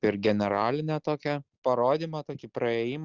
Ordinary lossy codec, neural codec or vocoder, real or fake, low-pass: Opus, 64 kbps; none; real; 7.2 kHz